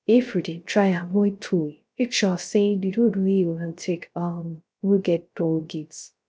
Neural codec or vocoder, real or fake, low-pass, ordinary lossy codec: codec, 16 kHz, 0.3 kbps, FocalCodec; fake; none; none